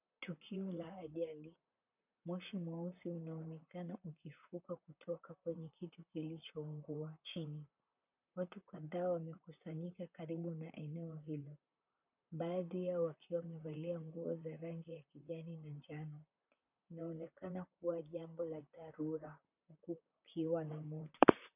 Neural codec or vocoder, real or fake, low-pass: vocoder, 44.1 kHz, 128 mel bands, Pupu-Vocoder; fake; 3.6 kHz